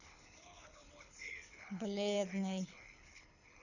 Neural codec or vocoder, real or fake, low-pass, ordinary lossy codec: codec, 24 kHz, 6 kbps, HILCodec; fake; 7.2 kHz; none